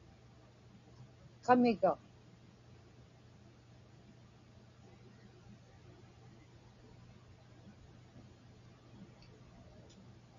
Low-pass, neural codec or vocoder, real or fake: 7.2 kHz; none; real